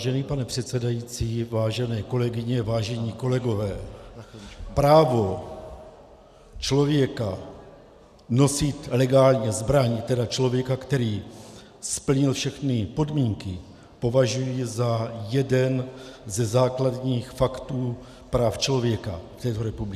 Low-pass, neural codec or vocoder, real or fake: 14.4 kHz; none; real